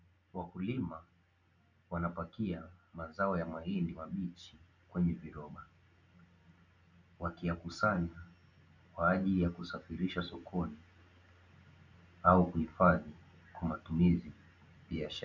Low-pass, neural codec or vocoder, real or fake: 7.2 kHz; none; real